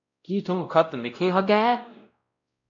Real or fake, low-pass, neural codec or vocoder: fake; 7.2 kHz; codec, 16 kHz, 0.5 kbps, X-Codec, WavLM features, trained on Multilingual LibriSpeech